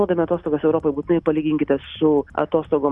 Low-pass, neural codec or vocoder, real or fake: 10.8 kHz; none; real